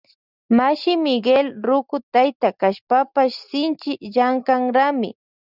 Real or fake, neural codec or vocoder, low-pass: real; none; 5.4 kHz